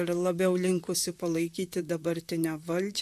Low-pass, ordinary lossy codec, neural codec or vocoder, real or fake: 14.4 kHz; MP3, 96 kbps; vocoder, 44.1 kHz, 128 mel bands, Pupu-Vocoder; fake